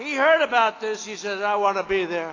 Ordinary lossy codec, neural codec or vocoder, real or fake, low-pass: AAC, 32 kbps; none; real; 7.2 kHz